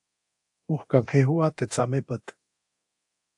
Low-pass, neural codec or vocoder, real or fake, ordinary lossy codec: 10.8 kHz; codec, 24 kHz, 0.9 kbps, DualCodec; fake; AAC, 64 kbps